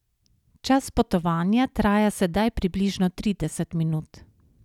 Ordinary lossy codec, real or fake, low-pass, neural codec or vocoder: none; real; 19.8 kHz; none